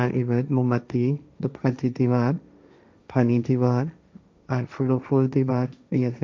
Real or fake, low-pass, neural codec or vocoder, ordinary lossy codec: fake; none; codec, 16 kHz, 1.1 kbps, Voila-Tokenizer; none